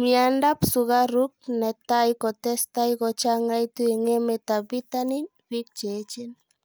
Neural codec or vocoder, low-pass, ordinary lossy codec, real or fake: none; none; none; real